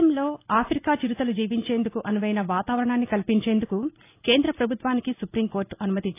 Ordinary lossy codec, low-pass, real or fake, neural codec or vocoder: AAC, 24 kbps; 3.6 kHz; real; none